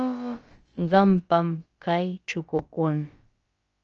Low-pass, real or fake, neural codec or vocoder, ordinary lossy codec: 7.2 kHz; fake; codec, 16 kHz, about 1 kbps, DyCAST, with the encoder's durations; Opus, 24 kbps